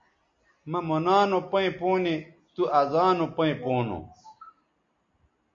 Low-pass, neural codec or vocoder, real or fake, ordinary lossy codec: 7.2 kHz; none; real; MP3, 48 kbps